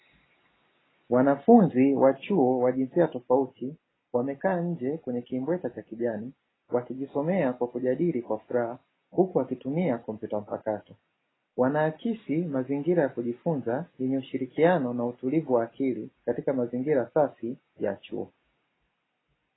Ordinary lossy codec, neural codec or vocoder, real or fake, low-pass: AAC, 16 kbps; none; real; 7.2 kHz